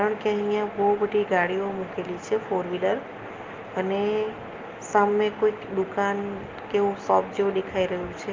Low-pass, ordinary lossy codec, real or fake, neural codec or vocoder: 7.2 kHz; Opus, 32 kbps; real; none